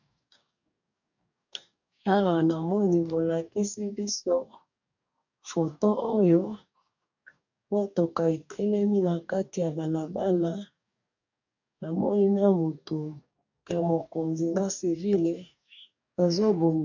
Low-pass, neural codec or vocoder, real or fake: 7.2 kHz; codec, 44.1 kHz, 2.6 kbps, DAC; fake